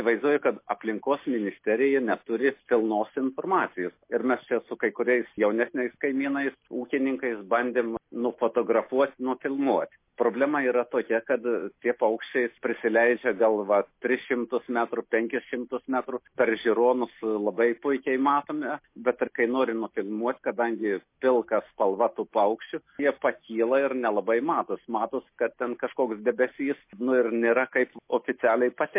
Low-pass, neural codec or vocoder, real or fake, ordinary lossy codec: 3.6 kHz; none; real; MP3, 24 kbps